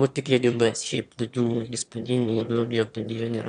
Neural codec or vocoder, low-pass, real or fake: autoencoder, 22.05 kHz, a latent of 192 numbers a frame, VITS, trained on one speaker; 9.9 kHz; fake